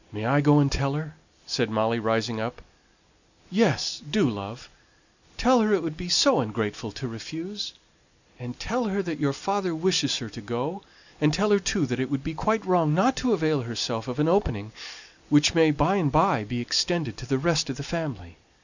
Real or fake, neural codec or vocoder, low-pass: real; none; 7.2 kHz